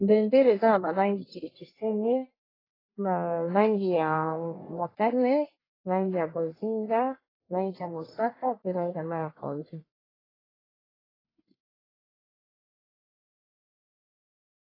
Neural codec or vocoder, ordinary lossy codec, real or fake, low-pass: codec, 44.1 kHz, 1.7 kbps, Pupu-Codec; AAC, 24 kbps; fake; 5.4 kHz